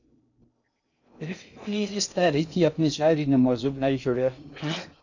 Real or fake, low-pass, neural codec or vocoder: fake; 7.2 kHz; codec, 16 kHz in and 24 kHz out, 0.6 kbps, FocalCodec, streaming, 2048 codes